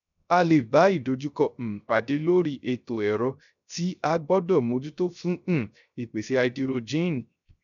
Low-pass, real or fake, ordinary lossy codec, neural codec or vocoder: 7.2 kHz; fake; MP3, 96 kbps; codec, 16 kHz, 0.3 kbps, FocalCodec